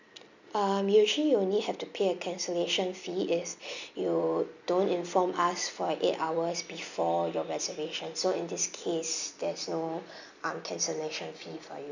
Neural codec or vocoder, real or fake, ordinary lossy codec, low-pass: none; real; none; 7.2 kHz